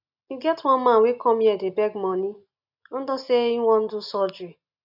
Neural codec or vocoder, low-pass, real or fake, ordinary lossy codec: none; 5.4 kHz; real; none